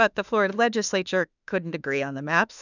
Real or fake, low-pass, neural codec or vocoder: fake; 7.2 kHz; autoencoder, 48 kHz, 32 numbers a frame, DAC-VAE, trained on Japanese speech